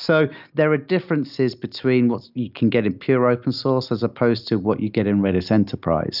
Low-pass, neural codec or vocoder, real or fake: 5.4 kHz; none; real